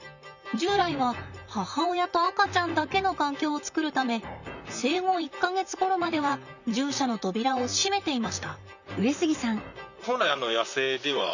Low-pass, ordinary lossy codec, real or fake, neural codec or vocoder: 7.2 kHz; none; fake; vocoder, 44.1 kHz, 128 mel bands, Pupu-Vocoder